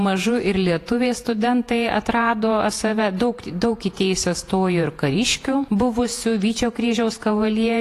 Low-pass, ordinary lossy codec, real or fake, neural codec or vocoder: 14.4 kHz; AAC, 48 kbps; fake; vocoder, 48 kHz, 128 mel bands, Vocos